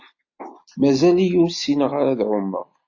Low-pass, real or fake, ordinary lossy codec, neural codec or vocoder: 7.2 kHz; real; AAC, 48 kbps; none